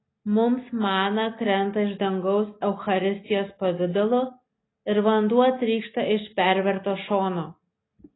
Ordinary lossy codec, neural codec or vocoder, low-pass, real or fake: AAC, 16 kbps; none; 7.2 kHz; real